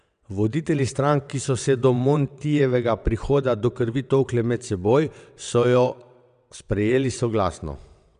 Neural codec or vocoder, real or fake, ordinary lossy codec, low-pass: vocoder, 22.05 kHz, 80 mel bands, WaveNeXt; fake; none; 9.9 kHz